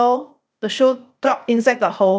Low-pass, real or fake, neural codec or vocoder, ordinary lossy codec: none; fake; codec, 16 kHz, 0.8 kbps, ZipCodec; none